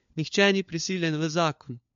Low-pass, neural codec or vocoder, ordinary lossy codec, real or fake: 7.2 kHz; codec, 16 kHz, 4 kbps, FunCodec, trained on LibriTTS, 50 frames a second; MP3, 48 kbps; fake